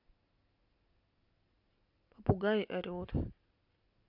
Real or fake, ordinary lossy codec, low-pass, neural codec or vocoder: real; none; 5.4 kHz; none